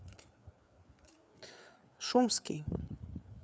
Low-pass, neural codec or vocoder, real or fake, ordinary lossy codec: none; codec, 16 kHz, 4 kbps, FreqCodec, larger model; fake; none